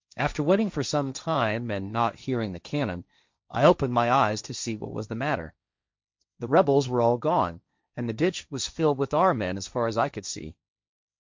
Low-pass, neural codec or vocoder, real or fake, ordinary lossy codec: 7.2 kHz; codec, 16 kHz, 1.1 kbps, Voila-Tokenizer; fake; MP3, 64 kbps